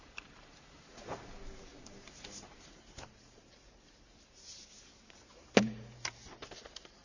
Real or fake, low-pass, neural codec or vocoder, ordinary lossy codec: fake; 7.2 kHz; vocoder, 44.1 kHz, 128 mel bands every 512 samples, BigVGAN v2; none